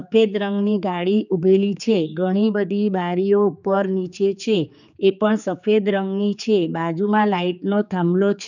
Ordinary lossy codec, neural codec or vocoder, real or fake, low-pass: none; codec, 16 kHz, 4 kbps, X-Codec, HuBERT features, trained on general audio; fake; 7.2 kHz